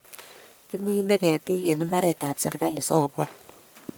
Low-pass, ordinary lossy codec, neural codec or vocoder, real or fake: none; none; codec, 44.1 kHz, 1.7 kbps, Pupu-Codec; fake